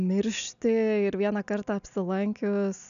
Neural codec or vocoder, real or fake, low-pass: none; real; 7.2 kHz